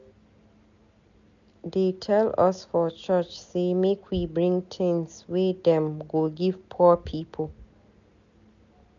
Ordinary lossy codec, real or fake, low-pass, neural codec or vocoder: none; real; 7.2 kHz; none